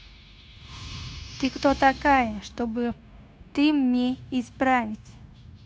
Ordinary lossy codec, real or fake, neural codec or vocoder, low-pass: none; fake; codec, 16 kHz, 0.9 kbps, LongCat-Audio-Codec; none